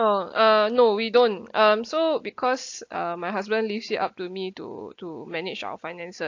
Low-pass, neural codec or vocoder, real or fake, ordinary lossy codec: 7.2 kHz; none; real; AAC, 48 kbps